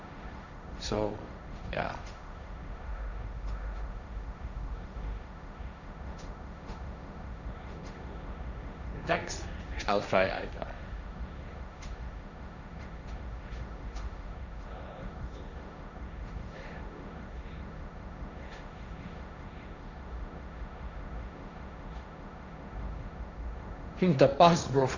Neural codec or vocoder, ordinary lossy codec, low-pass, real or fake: codec, 16 kHz, 1.1 kbps, Voila-Tokenizer; none; 7.2 kHz; fake